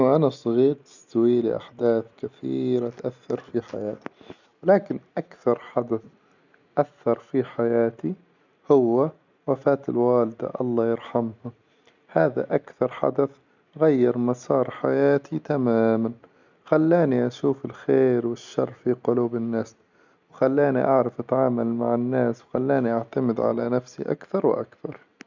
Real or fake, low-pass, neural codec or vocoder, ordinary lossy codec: real; 7.2 kHz; none; none